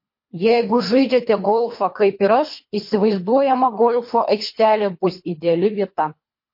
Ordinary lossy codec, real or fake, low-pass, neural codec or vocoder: MP3, 32 kbps; fake; 5.4 kHz; codec, 24 kHz, 3 kbps, HILCodec